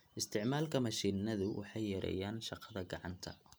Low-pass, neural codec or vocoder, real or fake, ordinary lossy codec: none; none; real; none